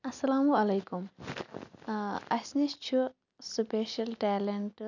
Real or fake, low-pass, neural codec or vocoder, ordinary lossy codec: real; 7.2 kHz; none; none